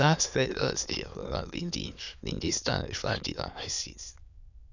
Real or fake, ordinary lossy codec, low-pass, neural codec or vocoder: fake; none; 7.2 kHz; autoencoder, 22.05 kHz, a latent of 192 numbers a frame, VITS, trained on many speakers